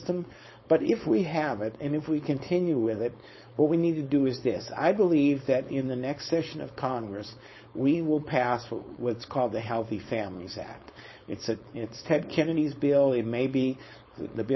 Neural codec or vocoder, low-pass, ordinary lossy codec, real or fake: codec, 16 kHz, 4.8 kbps, FACodec; 7.2 kHz; MP3, 24 kbps; fake